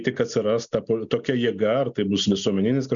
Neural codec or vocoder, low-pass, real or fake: none; 7.2 kHz; real